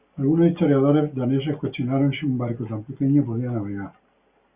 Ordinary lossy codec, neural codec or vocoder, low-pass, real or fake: Opus, 64 kbps; none; 3.6 kHz; real